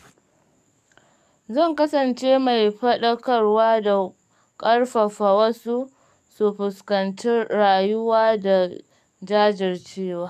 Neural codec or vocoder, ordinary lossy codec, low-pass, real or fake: codec, 44.1 kHz, 7.8 kbps, DAC; none; 14.4 kHz; fake